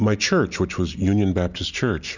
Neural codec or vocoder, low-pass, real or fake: none; 7.2 kHz; real